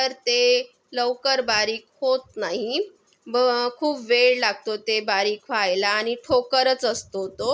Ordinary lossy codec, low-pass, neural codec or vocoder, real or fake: none; none; none; real